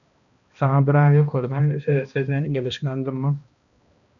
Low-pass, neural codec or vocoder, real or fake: 7.2 kHz; codec, 16 kHz, 1 kbps, X-Codec, HuBERT features, trained on balanced general audio; fake